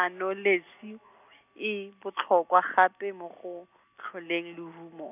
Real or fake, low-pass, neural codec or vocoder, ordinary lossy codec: real; 3.6 kHz; none; none